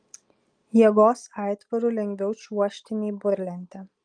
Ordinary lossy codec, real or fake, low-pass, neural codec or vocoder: Opus, 32 kbps; real; 9.9 kHz; none